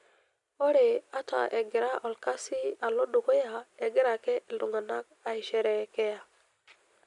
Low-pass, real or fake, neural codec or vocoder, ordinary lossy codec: 10.8 kHz; real; none; none